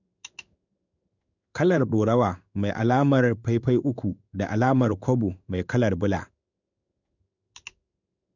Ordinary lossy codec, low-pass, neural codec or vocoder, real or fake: none; 7.2 kHz; codec, 16 kHz in and 24 kHz out, 1 kbps, XY-Tokenizer; fake